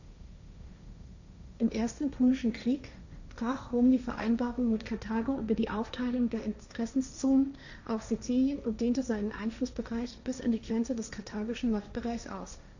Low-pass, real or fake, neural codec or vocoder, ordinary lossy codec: 7.2 kHz; fake; codec, 16 kHz, 1.1 kbps, Voila-Tokenizer; none